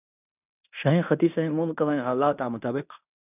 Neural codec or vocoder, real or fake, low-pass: codec, 16 kHz in and 24 kHz out, 0.9 kbps, LongCat-Audio-Codec, fine tuned four codebook decoder; fake; 3.6 kHz